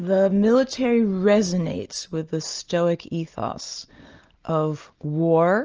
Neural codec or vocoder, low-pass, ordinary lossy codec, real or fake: none; 7.2 kHz; Opus, 24 kbps; real